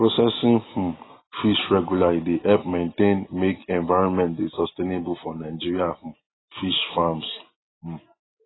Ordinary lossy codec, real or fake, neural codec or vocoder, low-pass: AAC, 16 kbps; real; none; 7.2 kHz